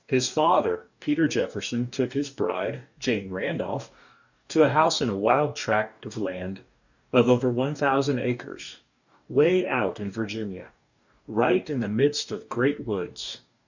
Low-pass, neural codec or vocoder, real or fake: 7.2 kHz; codec, 44.1 kHz, 2.6 kbps, DAC; fake